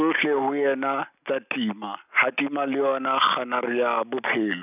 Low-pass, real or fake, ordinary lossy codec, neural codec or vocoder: 3.6 kHz; real; none; none